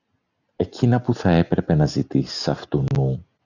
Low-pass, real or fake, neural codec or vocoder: 7.2 kHz; real; none